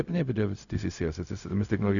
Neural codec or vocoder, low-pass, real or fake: codec, 16 kHz, 0.4 kbps, LongCat-Audio-Codec; 7.2 kHz; fake